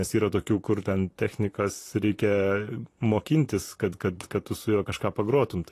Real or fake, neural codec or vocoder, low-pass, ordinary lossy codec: fake; vocoder, 44.1 kHz, 128 mel bands, Pupu-Vocoder; 14.4 kHz; AAC, 48 kbps